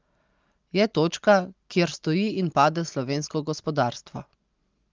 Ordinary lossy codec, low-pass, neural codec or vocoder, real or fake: Opus, 32 kbps; 7.2 kHz; none; real